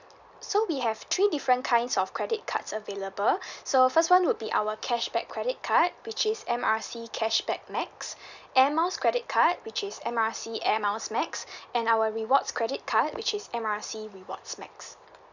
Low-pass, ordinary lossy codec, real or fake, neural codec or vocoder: 7.2 kHz; none; real; none